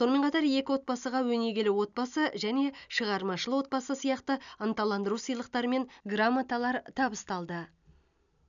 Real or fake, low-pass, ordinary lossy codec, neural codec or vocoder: real; 7.2 kHz; none; none